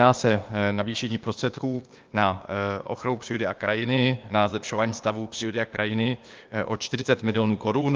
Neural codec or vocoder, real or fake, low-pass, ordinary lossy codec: codec, 16 kHz, 0.8 kbps, ZipCodec; fake; 7.2 kHz; Opus, 24 kbps